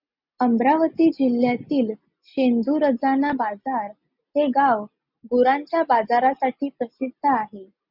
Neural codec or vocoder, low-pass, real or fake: none; 5.4 kHz; real